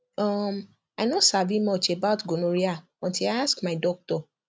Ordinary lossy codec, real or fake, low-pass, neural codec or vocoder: none; real; none; none